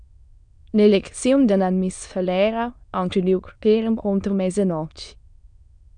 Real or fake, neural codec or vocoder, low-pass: fake; autoencoder, 22.05 kHz, a latent of 192 numbers a frame, VITS, trained on many speakers; 9.9 kHz